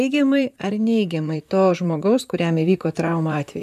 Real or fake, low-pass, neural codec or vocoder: fake; 14.4 kHz; vocoder, 44.1 kHz, 128 mel bands, Pupu-Vocoder